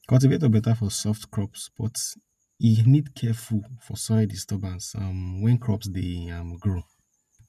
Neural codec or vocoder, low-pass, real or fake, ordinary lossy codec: none; 14.4 kHz; real; none